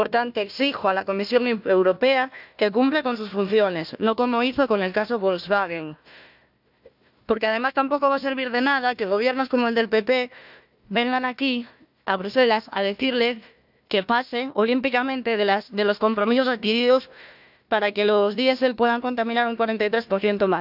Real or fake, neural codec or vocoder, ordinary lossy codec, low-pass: fake; codec, 16 kHz, 1 kbps, FunCodec, trained on Chinese and English, 50 frames a second; none; 5.4 kHz